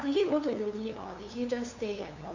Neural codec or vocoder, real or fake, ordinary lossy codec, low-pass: codec, 16 kHz, 2 kbps, FunCodec, trained on LibriTTS, 25 frames a second; fake; none; 7.2 kHz